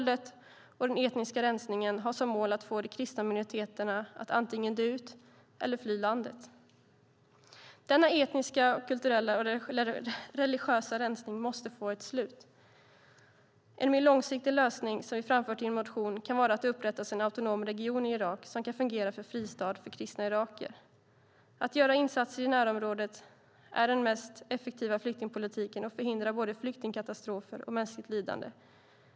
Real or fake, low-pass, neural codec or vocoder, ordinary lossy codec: real; none; none; none